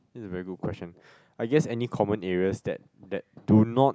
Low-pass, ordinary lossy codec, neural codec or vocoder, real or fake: none; none; none; real